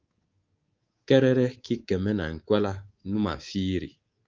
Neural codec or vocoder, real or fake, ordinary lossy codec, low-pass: codec, 24 kHz, 3.1 kbps, DualCodec; fake; Opus, 32 kbps; 7.2 kHz